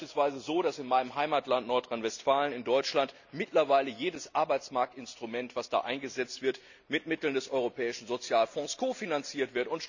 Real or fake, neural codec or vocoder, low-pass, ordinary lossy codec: real; none; 7.2 kHz; none